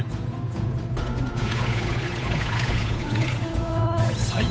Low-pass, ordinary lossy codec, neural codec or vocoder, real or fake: none; none; codec, 16 kHz, 8 kbps, FunCodec, trained on Chinese and English, 25 frames a second; fake